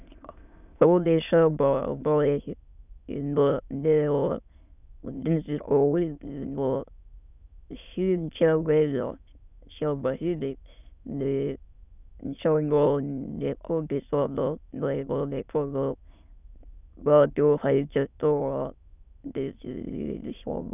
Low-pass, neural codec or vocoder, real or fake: 3.6 kHz; autoencoder, 22.05 kHz, a latent of 192 numbers a frame, VITS, trained on many speakers; fake